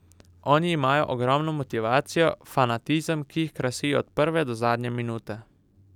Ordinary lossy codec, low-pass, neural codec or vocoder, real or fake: none; 19.8 kHz; none; real